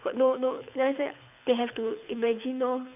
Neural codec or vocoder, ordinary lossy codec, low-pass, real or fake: codec, 24 kHz, 6 kbps, HILCodec; none; 3.6 kHz; fake